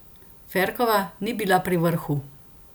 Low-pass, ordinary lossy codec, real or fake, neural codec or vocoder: none; none; real; none